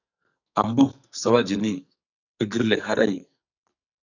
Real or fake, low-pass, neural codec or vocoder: fake; 7.2 kHz; codec, 44.1 kHz, 2.6 kbps, SNAC